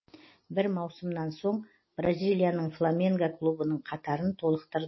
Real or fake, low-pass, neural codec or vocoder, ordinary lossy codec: real; 7.2 kHz; none; MP3, 24 kbps